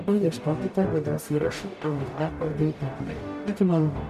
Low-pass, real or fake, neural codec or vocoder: 14.4 kHz; fake; codec, 44.1 kHz, 0.9 kbps, DAC